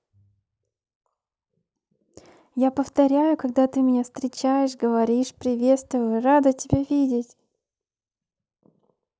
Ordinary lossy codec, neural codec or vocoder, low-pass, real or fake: none; none; none; real